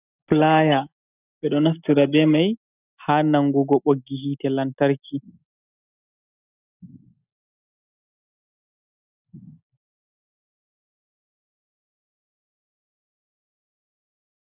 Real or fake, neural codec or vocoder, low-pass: real; none; 3.6 kHz